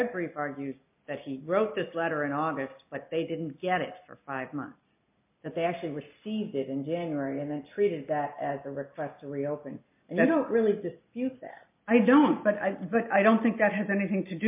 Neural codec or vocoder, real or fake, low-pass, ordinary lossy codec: none; real; 3.6 kHz; MP3, 32 kbps